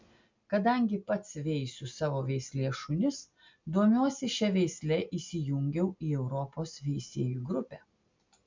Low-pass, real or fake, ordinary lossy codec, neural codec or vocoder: 7.2 kHz; real; MP3, 64 kbps; none